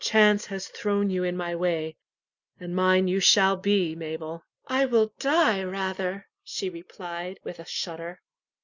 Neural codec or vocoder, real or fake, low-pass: none; real; 7.2 kHz